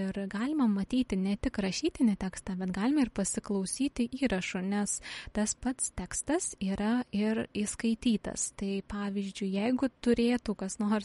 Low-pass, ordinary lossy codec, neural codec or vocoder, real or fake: 19.8 kHz; MP3, 48 kbps; none; real